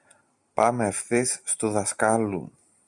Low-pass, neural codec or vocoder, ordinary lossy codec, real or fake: 10.8 kHz; none; MP3, 96 kbps; real